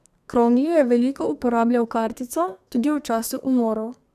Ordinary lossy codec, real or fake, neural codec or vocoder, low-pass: none; fake; codec, 32 kHz, 1.9 kbps, SNAC; 14.4 kHz